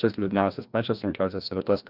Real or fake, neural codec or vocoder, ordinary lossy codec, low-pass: fake; codec, 16 kHz, 1 kbps, FreqCodec, larger model; Opus, 64 kbps; 5.4 kHz